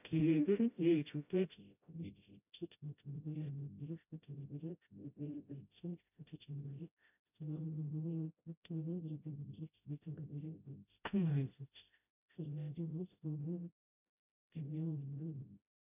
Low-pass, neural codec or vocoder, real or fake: 3.6 kHz; codec, 16 kHz, 0.5 kbps, FreqCodec, smaller model; fake